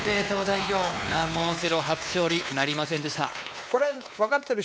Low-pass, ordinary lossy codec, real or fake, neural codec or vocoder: none; none; fake; codec, 16 kHz, 2 kbps, X-Codec, WavLM features, trained on Multilingual LibriSpeech